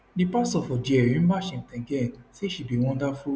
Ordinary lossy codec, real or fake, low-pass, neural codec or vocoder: none; real; none; none